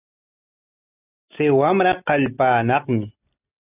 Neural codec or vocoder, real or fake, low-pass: none; real; 3.6 kHz